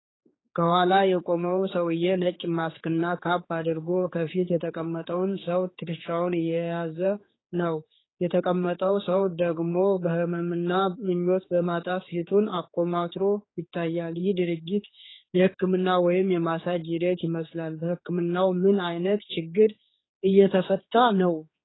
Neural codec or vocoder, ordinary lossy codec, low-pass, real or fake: codec, 16 kHz, 4 kbps, X-Codec, HuBERT features, trained on general audio; AAC, 16 kbps; 7.2 kHz; fake